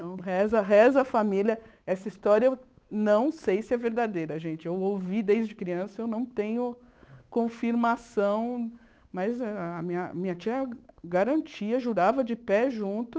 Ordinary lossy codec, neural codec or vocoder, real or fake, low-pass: none; codec, 16 kHz, 8 kbps, FunCodec, trained on Chinese and English, 25 frames a second; fake; none